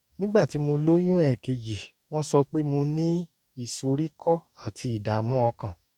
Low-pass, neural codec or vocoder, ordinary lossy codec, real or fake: 19.8 kHz; codec, 44.1 kHz, 2.6 kbps, DAC; none; fake